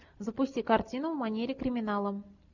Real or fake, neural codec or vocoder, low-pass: real; none; 7.2 kHz